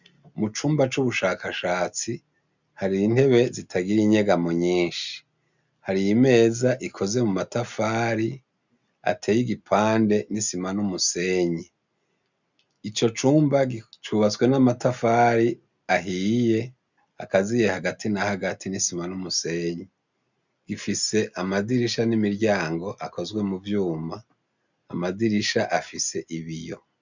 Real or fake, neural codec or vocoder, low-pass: real; none; 7.2 kHz